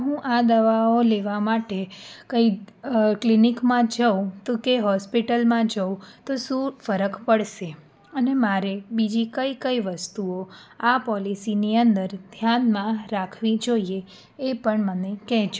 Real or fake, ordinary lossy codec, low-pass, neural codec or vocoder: real; none; none; none